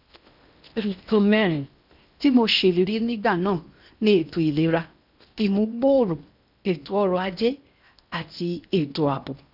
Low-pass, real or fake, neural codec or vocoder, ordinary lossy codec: 5.4 kHz; fake; codec, 16 kHz in and 24 kHz out, 0.8 kbps, FocalCodec, streaming, 65536 codes; none